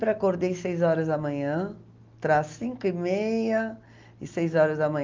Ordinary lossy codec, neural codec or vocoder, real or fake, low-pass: Opus, 24 kbps; none; real; 7.2 kHz